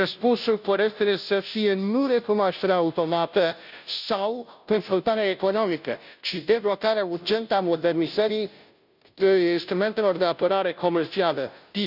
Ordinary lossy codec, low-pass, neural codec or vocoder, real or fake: none; 5.4 kHz; codec, 16 kHz, 0.5 kbps, FunCodec, trained on Chinese and English, 25 frames a second; fake